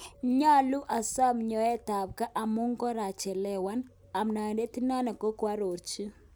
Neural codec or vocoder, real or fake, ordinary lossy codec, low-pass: none; real; none; none